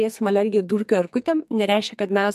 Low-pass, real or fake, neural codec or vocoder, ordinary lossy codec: 14.4 kHz; fake; codec, 44.1 kHz, 2.6 kbps, SNAC; MP3, 64 kbps